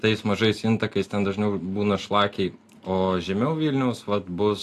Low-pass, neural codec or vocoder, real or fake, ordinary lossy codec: 14.4 kHz; none; real; AAC, 64 kbps